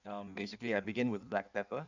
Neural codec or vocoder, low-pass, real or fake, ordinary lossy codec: codec, 16 kHz in and 24 kHz out, 1.1 kbps, FireRedTTS-2 codec; 7.2 kHz; fake; none